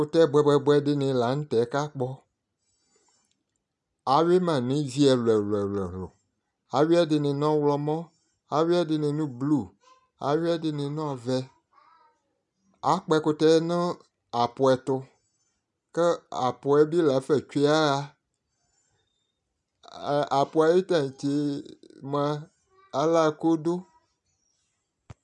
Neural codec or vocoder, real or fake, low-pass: none; real; 10.8 kHz